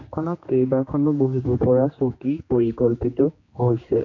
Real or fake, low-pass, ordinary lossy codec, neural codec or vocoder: fake; 7.2 kHz; AAC, 48 kbps; codec, 16 kHz, 2 kbps, X-Codec, HuBERT features, trained on general audio